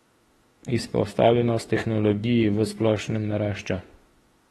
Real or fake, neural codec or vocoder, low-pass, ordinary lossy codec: fake; autoencoder, 48 kHz, 32 numbers a frame, DAC-VAE, trained on Japanese speech; 19.8 kHz; AAC, 32 kbps